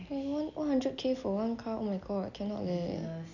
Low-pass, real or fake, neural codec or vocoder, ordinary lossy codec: 7.2 kHz; real; none; none